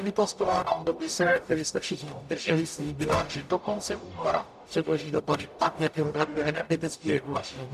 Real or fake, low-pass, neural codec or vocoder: fake; 14.4 kHz; codec, 44.1 kHz, 0.9 kbps, DAC